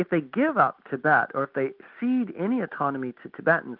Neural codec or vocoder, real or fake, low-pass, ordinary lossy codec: none; real; 5.4 kHz; Opus, 24 kbps